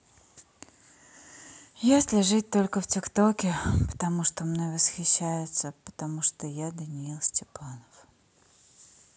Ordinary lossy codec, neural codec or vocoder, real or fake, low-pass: none; none; real; none